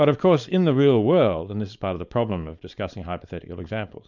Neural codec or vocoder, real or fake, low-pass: codec, 16 kHz, 4.8 kbps, FACodec; fake; 7.2 kHz